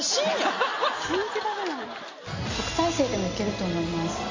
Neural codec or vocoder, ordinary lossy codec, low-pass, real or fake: none; MP3, 32 kbps; 7.2 kHz; real